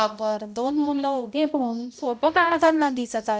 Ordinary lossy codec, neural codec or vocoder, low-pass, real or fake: none; codec, 16 kHz, 0.5 kbps, X-Codec, HuBERT features, trained on balanced general audio; none; fake